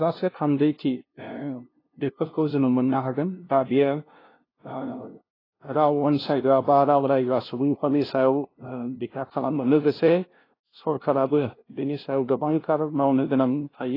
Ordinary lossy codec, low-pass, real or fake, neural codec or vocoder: AAC, 24 kbps; 5.4 kHz; fake; codec, 16 kHz, 0.5 kbps, FunCodec, trained on LibriTTS, 25 frames a second